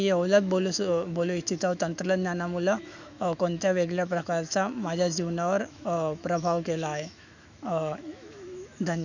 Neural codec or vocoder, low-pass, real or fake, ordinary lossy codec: autoencoder, 48 kHz, 128 numbers a frame, DAC-VAE, trained on Japanese speech; 7.2 kHz; fake; none